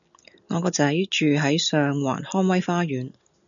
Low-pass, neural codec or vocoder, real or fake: 7.2 kHz; none; real